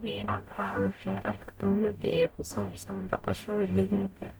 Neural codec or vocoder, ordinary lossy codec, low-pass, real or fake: codec, 44.1 kHz, 0.9 kbps, DAC; none; none; fake